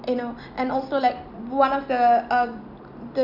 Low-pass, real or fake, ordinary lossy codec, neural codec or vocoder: 5.4 kHz; real; none; none